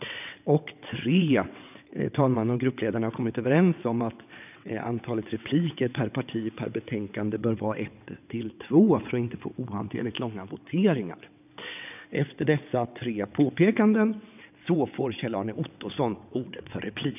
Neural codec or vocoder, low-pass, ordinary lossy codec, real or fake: vocoder, 22.05 kHz, 80 mel bands, WaveNeXt; 3.6 kHz; none; fake